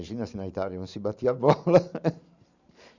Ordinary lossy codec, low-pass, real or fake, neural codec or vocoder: none; 7.2 kHz; fake; vocoder, 44.1 kHz, 128 mel bands every 512 samples, BigVGAN v2